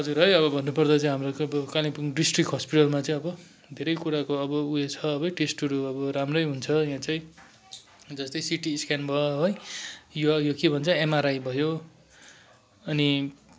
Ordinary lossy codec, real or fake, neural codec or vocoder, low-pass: none; real; none; none